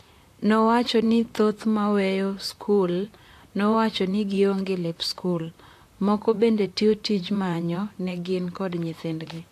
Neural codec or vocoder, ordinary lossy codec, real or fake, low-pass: vocoder, 44.1 kHz, 128 mel bands, Pupu-Vocoder; AAC, 64 kbps; fake; 14.4 kHz